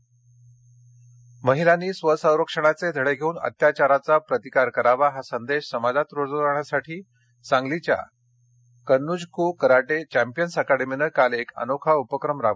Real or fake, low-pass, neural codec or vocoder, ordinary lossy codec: real; none; none; none